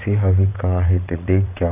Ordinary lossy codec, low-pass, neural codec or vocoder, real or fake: none; 3.6 kHz; vocoder, 22.05 kHz, 80 mel bands, WaveNeXt; fake